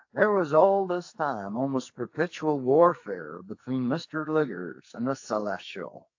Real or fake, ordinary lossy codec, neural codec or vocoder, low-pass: fake; AAC, 48 kbps; codec, 44.1 kHz, 2.6 kbps, SNAC; 7.2 kHz